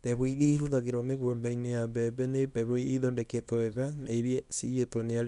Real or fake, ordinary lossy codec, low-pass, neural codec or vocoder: fake; none; 10.8 kHz; codec, 24 kHz, 0.9 kbps, WavTokenizer, small release